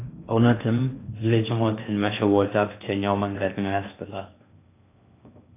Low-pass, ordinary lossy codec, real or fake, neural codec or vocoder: 3.6 kHz; AAC, 24 kbps; fake; codec, 16 kHz in and 24 kHz out, 0.6 kbps, FocalCodec, streaming, 2048 codes